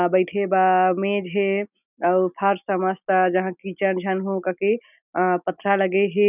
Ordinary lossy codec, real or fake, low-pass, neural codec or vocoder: none; real; 3.6 kHz; none